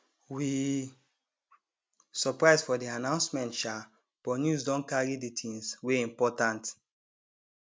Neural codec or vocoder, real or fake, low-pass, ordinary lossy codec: none; real; none; none